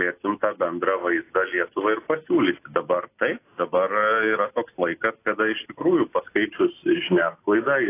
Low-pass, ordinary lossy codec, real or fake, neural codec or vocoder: 3.6 kHz; AAC, 24 kbps; real; none